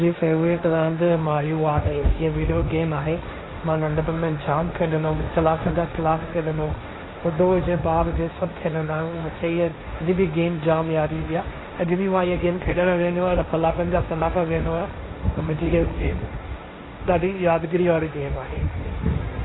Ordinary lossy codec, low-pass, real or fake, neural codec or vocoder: AAC, 16 kbps; 7.2 kHz; fake; codec, 16 kHz, 1.1 kbps, Voila-Tokenizer